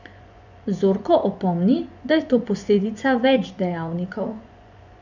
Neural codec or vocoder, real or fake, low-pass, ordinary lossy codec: vocoder, 24 kHz, 100 mel bands, Vocos; fake; 7.2 kHz; none